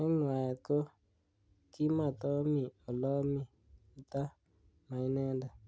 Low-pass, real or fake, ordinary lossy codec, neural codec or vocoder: none; real; none; none